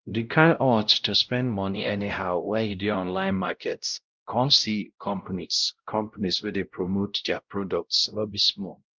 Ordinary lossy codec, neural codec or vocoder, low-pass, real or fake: Opus, 24 kbps; codec, 16 kHz, 0.5 kbps, X-Codec, WavLM features, trained on Multilingual LibriSpeech; 7.2 kHz; fake